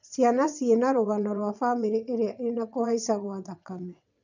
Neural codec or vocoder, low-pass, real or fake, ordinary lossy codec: vocoder, 22.05 kHz, 80 mel bands, WaveNeXt; 7.2 kHz; fake; none